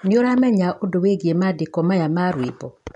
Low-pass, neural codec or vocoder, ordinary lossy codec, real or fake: 10.8 kHz; none; none; real